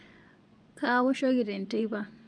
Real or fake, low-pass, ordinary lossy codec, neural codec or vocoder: fake; 9.9 kHz; none; vocoder, 24 kHz, 100 mel bands, Vocos